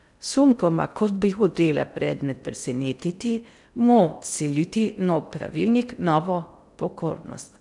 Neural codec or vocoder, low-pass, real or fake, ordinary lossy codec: codec, 16 kHz in and 24 kHz out, 0.6 kbps, FocalCodec, streaming, 2048 codes; 10.8 kHz; fake; none